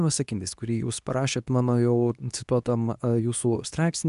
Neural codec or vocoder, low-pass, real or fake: codec, 24 kHz, 0.9 kbps, WavTokenizer, medium speech release version 2; 10.8 kHz; fake